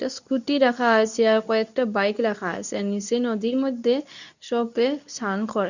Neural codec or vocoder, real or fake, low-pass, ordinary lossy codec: codec, 24 kHz, 0.9 kbps, WavTokenizer, medium speech release version 2; fake; 7.2 kHz; none